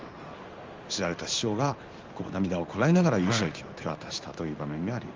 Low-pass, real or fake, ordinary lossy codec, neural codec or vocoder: 7.2 kHz; fake; Opus, 32 kbps; codec, 16 kHz in and 24 kHz out, 1 kbps, XY-Tokenizer